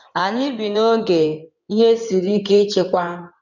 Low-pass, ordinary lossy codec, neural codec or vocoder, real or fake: 7.2 kHz; none; codec, 16 kHz in and 24 kHz out, 2.2 kbps, FireRedTTS-2 codec; fake